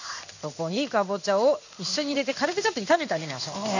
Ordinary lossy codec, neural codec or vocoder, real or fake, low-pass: none; codec, 16 kHz in and 24 kHz out, 1 kbps, XY-Tokenizer; fake; 7.2 kHz